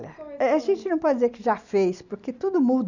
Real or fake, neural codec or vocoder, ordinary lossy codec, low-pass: real; none; none; 7.2 kHz